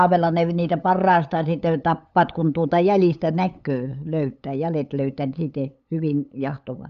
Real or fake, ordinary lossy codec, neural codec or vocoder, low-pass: fake; none; codec, 16 kHz, 8 kbps, FunCodec, trained on LibriTTS, 25 frames a second; 7.2 kHz